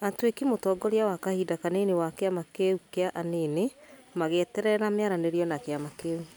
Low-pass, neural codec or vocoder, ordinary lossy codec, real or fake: none; none; none; real